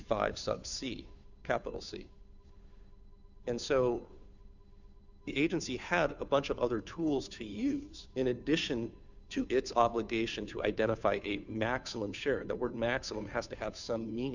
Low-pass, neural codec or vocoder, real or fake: 7.2 kHz; codec, 16 kHz, 2 kbps, FunCodec, trained on Chinese and English, 25 frames a second; fake